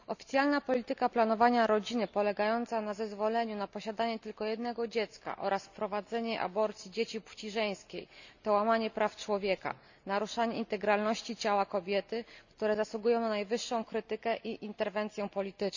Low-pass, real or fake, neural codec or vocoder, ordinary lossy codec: 7.2 kHz; real; none; none